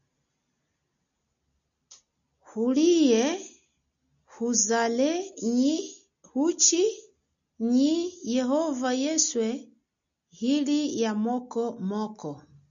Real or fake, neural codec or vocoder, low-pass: real; none; 7.2 kHz